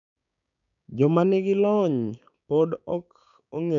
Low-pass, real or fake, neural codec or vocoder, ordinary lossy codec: 7.2 kHz; fake; codec, 16 kHz, 6 kbps, DAC; none